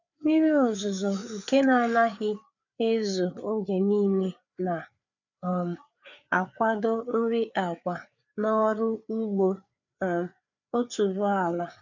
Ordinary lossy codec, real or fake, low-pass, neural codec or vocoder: none; fake; 7.2 kHz; codec, 16 kHz, 4 kbps, FreqCodec, larger model